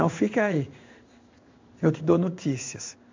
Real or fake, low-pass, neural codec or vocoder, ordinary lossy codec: fake; 7.2 kHz; codec, 16 kHz in and 24 kHz out, 1 kbps, XY-Tokenizer; MP3, 48 kbps